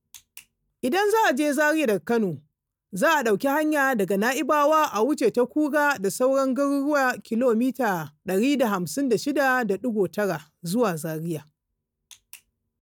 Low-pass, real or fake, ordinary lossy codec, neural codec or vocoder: none; real; none; none